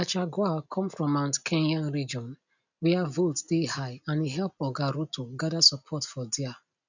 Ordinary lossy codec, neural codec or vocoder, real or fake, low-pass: none; none; real; 7.2 kHz